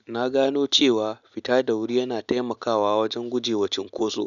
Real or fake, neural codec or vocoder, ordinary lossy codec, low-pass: real; none; none; 7.2 kHz